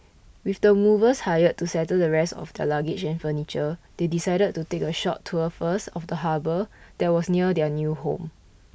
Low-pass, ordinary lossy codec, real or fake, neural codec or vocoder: none; none; real; none